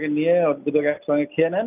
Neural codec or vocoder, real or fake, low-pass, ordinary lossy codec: none; real; 3.6 kHz; none